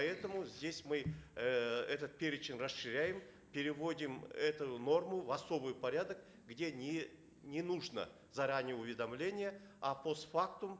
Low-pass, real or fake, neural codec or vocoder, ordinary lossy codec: none; real; none; none